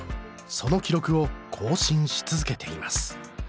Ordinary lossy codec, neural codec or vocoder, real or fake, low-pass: none; none; real; none